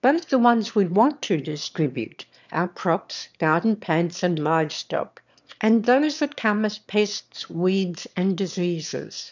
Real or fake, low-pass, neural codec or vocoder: fake; 7.2 kHz; autoencoder, 22.05 kHz, a latent of 192 numbers a frame, VITS, trained on one speaker